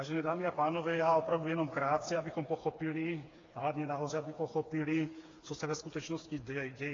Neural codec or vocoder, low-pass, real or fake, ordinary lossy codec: codec, 16 kHz, 4 kbps, FreqCodec, smaller model; 7.2 kHz; fake; AAC, 32 kbps